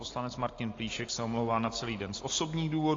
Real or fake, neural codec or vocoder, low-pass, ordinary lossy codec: real; none; 7.2 kHz; AAC, 32 kbps